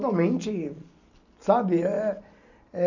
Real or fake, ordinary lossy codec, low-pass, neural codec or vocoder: real; none; 7.2 kHz; none